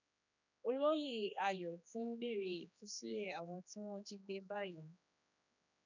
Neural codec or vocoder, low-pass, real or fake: codec, 16 kHz, 2 kbps, X-Codec, HuBERT features, trained on general audio; 7.2 kHz; fake